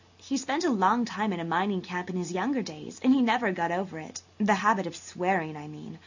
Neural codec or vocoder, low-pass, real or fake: none; 7.2 kHz; real